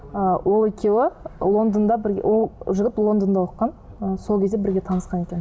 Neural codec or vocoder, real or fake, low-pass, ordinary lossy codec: none; real; none; none